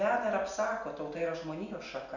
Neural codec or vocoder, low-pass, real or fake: none; 7.2 kHz; real